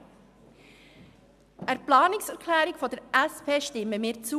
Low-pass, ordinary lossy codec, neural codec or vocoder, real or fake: 14.4 kHz; none; none; real